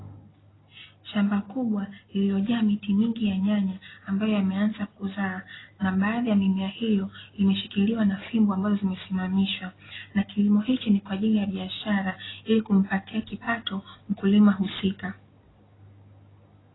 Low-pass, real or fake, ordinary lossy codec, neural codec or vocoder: 7.2 kHz; real; AAC, 16 kbps; none